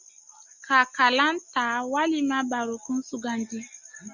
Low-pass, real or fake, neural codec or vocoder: 7.2 kHz; real; none